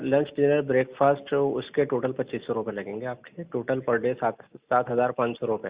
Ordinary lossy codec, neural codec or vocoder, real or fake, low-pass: Opus, 64 kbps; none; real; 3.6 kHz